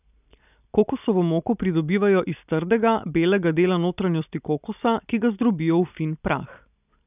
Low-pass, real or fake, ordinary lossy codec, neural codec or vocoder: 3.6 kHz; real; none; none